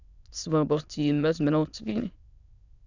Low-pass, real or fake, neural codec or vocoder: 7.2 kHz; fake; autoencoder, 22.05 kHz, a latent of 192 numbers a frame, VITS, trained on many speakers